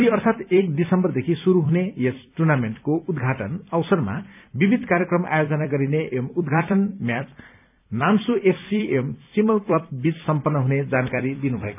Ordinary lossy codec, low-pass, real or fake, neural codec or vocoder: none; 3.6 kHz; fake; vocoder, 44.1 kHz, 128 mel bands every 256 samples, BigVGAN v2